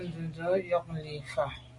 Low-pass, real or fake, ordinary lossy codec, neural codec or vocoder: 10.8 kHz; real; Opus, 64 kbps; none